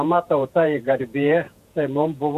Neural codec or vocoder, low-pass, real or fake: vocoder, 48 kHz, 128 mel bands, Vocos; 14.4 kHz; fake